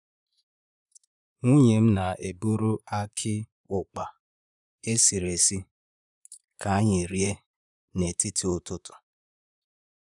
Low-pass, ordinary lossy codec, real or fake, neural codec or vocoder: 10.8 kHz; none; fake; vocoder, 44.1 kHz, 128 mel bands, Pupu-Vocoder